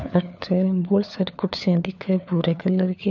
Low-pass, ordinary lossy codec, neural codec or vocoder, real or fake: 7.2 kHz; none; codec, 16 kHz, 4 kbps, FunCodec, trained on LibriTTS, 50 frames a second; fake